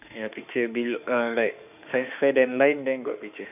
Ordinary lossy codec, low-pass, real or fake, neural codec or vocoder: none; 3.6 kHz; fake; autoencoder, 48 kHz, 32 numbers a frame, DAC-VAE, trained on Japanese speech